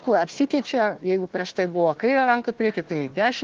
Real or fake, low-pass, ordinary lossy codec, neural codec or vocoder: fake; 7.2 kHz; Opus, 16 kbps; codec, 16 kHz, 1 kbps, FunCodec, trained on Chinese and English, 50 frames a second